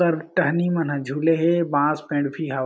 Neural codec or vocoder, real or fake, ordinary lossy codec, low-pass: none; real; none; none